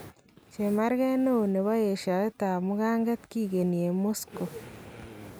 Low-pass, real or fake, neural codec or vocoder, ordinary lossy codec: none; real; none; none